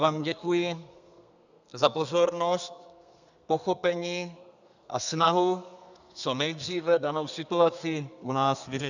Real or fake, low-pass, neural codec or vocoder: fake; 7.2 kHz; codec, 32 kHz, 1.9 kbps, SNAC